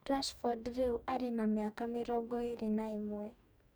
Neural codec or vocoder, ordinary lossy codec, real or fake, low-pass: codec, 44.1 kHz, 2.6 kbps, DAC; none; fake; none